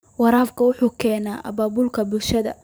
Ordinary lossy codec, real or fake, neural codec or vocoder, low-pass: none; fake; vocoder, 44.1 kHz, 128 mel bands every 512 samples, BigVGAN v2; none